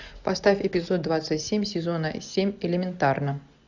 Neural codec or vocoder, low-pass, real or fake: none; 7.2 kHz; real